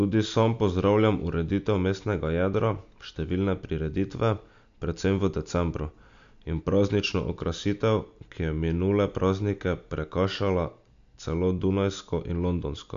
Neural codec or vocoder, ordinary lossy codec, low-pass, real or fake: none; MP3, 64 kbps; 7.2 kHz; real